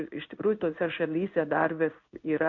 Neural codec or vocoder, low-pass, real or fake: codec, 16 kHz in and 24 kHz out, 1 kbps, XY-Tokenizer; 7.2 kHz; fake